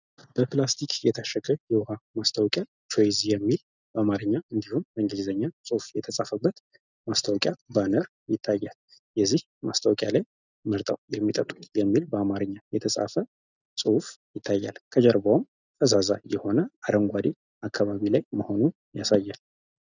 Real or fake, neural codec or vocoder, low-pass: real; none; 7.2 kHz